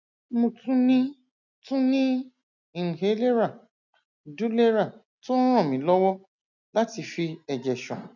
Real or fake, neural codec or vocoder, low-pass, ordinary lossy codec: real; none; 7.2 kHz; none